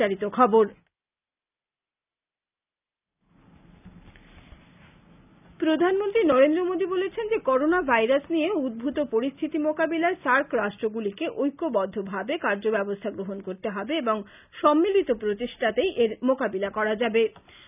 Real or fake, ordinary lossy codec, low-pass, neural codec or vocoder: real; none; 3.6 kHz; none